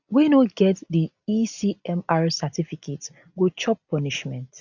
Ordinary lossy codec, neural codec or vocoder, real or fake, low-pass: none; none; real; 7.2 kHz